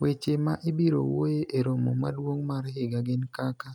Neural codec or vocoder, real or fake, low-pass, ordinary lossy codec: none; real; 19.8 kHz; none